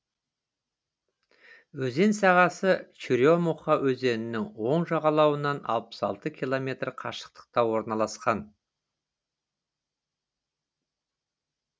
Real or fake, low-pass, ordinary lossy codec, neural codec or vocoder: real; none; none; none